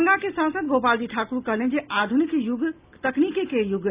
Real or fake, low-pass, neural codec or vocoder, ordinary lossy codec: real; 3.6 kHz; none; Opus, 64 kbps